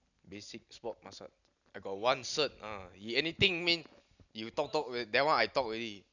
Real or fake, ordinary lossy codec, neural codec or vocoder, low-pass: real; none; none; 7.2 kHz